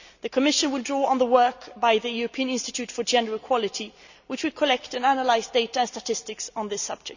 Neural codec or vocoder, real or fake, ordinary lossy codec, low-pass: none; real; none; 7.2 kHz